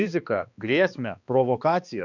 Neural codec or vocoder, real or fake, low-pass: codec, 16 kHz, 2 kbps, X-Codec, HuBERT features, trained on balanced general audio; fake; 7.2 kHz